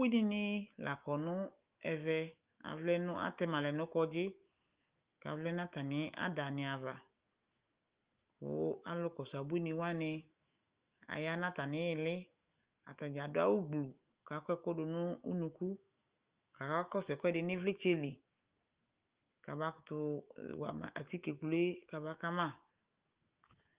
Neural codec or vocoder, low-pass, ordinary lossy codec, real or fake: autoencoder, 48 kHz, 128 numbers a frame, DAC-VAE, trained on Japanese speech; 3.6 kHz; Opus, 24 kbps; fake